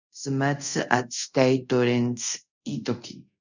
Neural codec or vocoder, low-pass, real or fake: codec, 24 kHz, 0.5 kbps, DualCodec; 7.2 kHz; fake